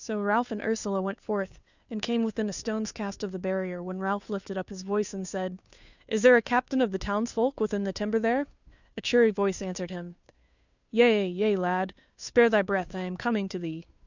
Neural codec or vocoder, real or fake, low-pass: codec, 16 kHz, 2 kbps, FunCodec, trained on Chinese and English, 25 frames a second; fake; 7.2 kHz